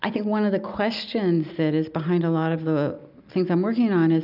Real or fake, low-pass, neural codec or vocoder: real; 5.4 kHz; none